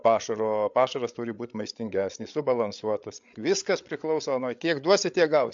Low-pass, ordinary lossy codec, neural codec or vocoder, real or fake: 7.2 kHz; AAC, 64 kbps; codec, 16 kHz, 8 kbps, FreqCodec, larger model; fake